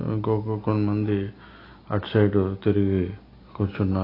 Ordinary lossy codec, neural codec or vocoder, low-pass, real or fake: AAC, 32 kbps; none; 5.4 kHz; real